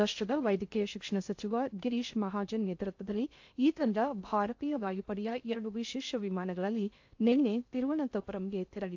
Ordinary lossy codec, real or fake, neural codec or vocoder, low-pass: AAC, 48 kbps; fake; codec, 16 kHz in and 24 kHz out, 0.6 kbps, FocalCodec, streaming, 2048 codes; 7.2 kHz